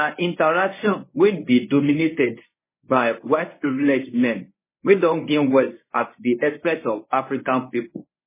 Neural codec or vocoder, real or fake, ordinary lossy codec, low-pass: codec, 24 kHz, 0.9 kbps, WavTokenizer, medium speech release version 1; fake; MP3, 16 kbps; 3.6 kHz